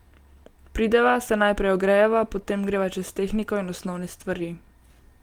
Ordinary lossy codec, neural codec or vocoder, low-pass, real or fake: Opus, 24 kbps; none; 19.8 kHz; real